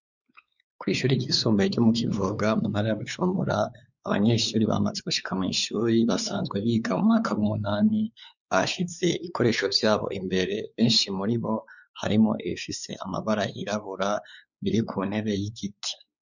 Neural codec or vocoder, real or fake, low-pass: codec, 16 kHz, 4 kbps, X-Codec, WavLM features, trained on Multilingual LibriSpeech; fake; 7.2 kHz